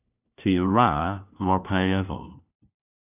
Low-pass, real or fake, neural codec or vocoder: 3.6 kHz; fake; codec, 16 kHz, 1 kbps, FunCodec, trained on LibriTTS, 50 frames a second